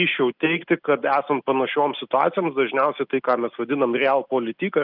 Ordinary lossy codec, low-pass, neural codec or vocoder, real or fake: Opus, 32 kbps; 5.4 kHz; none; real